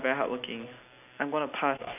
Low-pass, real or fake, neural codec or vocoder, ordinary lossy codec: 3.6 kHz; real; none; none